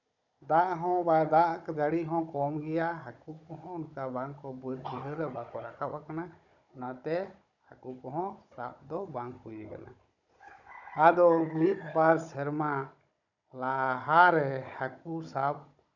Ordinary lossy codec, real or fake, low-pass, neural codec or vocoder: none; fake; 7.2 kHz; codec, 16 kHz, 16 kbps, FunCodec, trained on Chinese and English, 50 frames a second